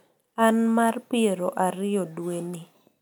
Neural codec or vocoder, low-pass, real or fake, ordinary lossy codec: none; none; real; none